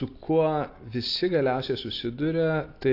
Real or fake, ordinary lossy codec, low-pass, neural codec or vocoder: real; AAC, 32 kbps; 5.4 kHz; none